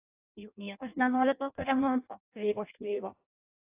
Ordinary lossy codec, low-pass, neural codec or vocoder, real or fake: AAC, 32 kbps; 3.6 kHz; codec, 16 kHz in and 24 kHz out, 0.6 kbps, FireRedTTS-2 codec; fake